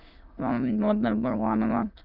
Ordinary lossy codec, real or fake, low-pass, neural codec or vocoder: Opus, 24 kbps; fake; 5.4 kHz; autoencoder, 22.05 kHz, a latent of 192 numbers a frame, VITS, trained on many speakers